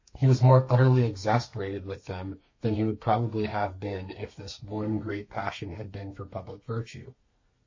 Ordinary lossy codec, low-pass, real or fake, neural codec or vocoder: MP3, 32 kbps; 7.2 kHz; fake; codec, 32 kHz, 1.9 kbps, SNAC